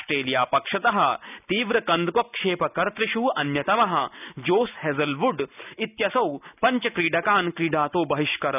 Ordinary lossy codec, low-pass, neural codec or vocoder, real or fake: none; 3.6 kHz; none; real